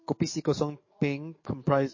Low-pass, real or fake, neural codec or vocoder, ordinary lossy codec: 7.2 kHz; fake; codec, 44.1 kHz, 7.8 kbps, DAC; MP3, 32 kbps